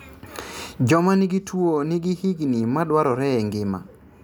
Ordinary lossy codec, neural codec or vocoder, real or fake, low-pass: none; none; real; none